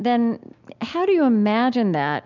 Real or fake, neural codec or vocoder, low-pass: real; none; 7.2 kHz